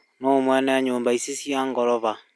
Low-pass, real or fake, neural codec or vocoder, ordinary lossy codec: none; real; none; none